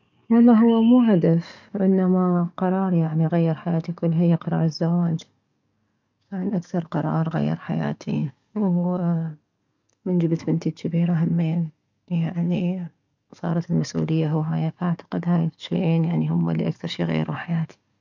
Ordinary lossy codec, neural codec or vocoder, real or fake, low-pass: none; codec, 44.1 kHz, 7.8 kbps, DAC; fake; 7.2 kHz